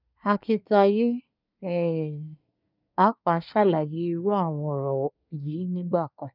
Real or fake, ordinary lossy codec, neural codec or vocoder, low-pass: fake; none; codec, 24 kHz, 1 kbps, SNAC; 5.4 kHz